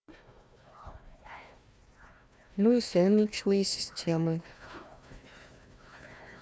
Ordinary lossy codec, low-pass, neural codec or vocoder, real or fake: none; none; codec, 16 kHz, 1 kbps, FunCodec, trained on Chinese and English, 50 frames a second; fake